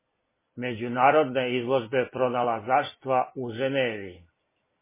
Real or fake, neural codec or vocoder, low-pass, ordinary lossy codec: real; none; 3.6 kHz; MP3, 16 kbps